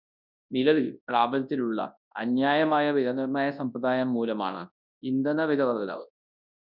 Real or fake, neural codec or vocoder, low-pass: fake; codec, 24 kHz, 0.9 kbps, WavTokenizer, large speech release; 5.4 kHz